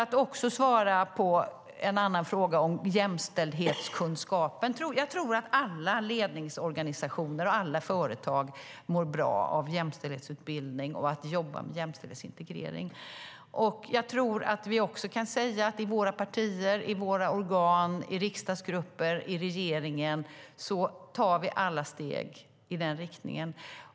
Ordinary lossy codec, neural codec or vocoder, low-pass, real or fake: none; none; none; real